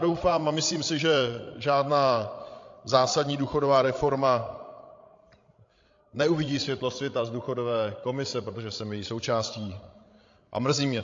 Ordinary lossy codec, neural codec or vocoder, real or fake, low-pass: AAC, 48 kbps; codec, 16 kHz, 16 kbps, FreqCodec, larger model; fake; 7.2 kHz